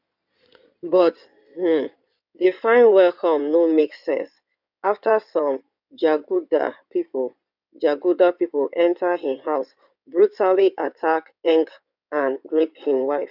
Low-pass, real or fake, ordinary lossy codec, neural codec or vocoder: 5.4 kHz; fake; none; codec, 16 kHz in and 24 kHz out, 2.2 kbps, FireRedTTS-2 codec